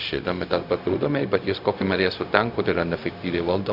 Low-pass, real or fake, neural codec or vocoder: 5.4 kHz; fake; codec, 16 kHz, 0.4 kbps, LongCat-Audio-Codec